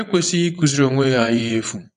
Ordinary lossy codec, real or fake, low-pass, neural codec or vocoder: none; fake; 9.9 kHz; vocoder, 22.05 kHz, 80 mel bands, WaveNeXt